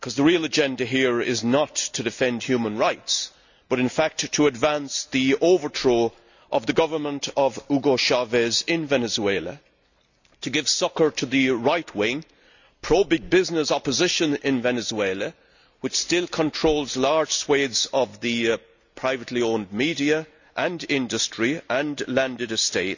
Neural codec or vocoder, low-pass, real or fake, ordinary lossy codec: none; 7.2 kHz; real; none